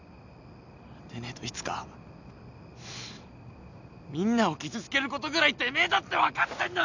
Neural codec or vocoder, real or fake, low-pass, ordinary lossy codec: none; real; 7.2 kHz; none